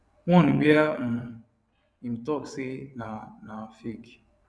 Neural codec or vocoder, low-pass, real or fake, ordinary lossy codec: vocoder, 22.05 kHz, 80 mel bands, WaveNeXt; none; fake; none